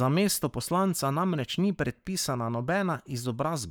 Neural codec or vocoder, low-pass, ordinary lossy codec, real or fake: codec, 44.1 kHz, 7.8 kbps, Pupu-Codec; none; none; fake